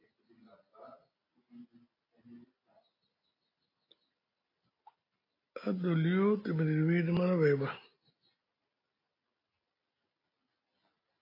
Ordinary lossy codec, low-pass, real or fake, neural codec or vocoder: AAC, 24 kbps; 5.4 kHz; real; none